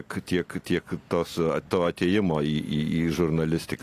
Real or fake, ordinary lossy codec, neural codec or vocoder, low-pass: real; AAC, 48 kbps; none; 14.4 kHz